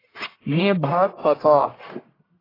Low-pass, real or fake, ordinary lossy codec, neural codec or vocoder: 5.4 kHz; fake; AAC, 24 kbps; codec, 44.1 kHz, 1.7 kbps, Pupu-Codec